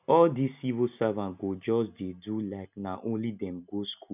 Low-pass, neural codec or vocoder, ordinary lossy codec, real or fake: 3.6 kHz; none; none; real